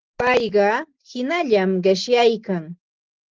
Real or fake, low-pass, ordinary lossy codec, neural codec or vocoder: real; 7.2 kHz; Opus, 16 kbps; none